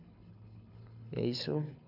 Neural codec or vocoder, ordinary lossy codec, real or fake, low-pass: codec, 24 kHz, 6 kbps, HILCodec; none; fake; 5.4 kHz